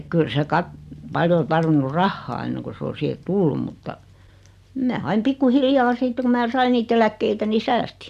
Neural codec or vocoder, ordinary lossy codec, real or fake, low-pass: none; none; real; 14.4 kHz